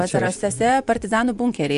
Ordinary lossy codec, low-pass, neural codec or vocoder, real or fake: MP3, 96 kbps; 10.8 kHz; vocoder, 24 kHz, 100 mel bands, Vocos; fake